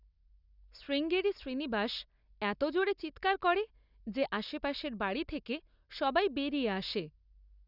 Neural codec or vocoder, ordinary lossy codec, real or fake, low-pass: none; none; real; 5.4 kHz